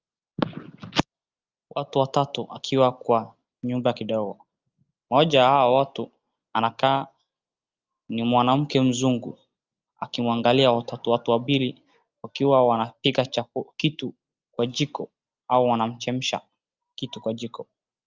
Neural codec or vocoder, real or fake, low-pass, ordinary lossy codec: none; real; 7.2 kHz; Opus, 24 kbps